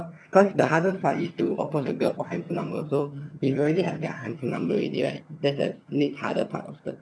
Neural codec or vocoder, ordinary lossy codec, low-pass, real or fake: vocoder, 22.05 kHz, 80 mel bands, HiFi-GAN; none; none; fake